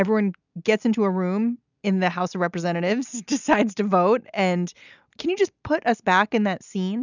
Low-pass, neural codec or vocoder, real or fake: 7.2 kHz; none; real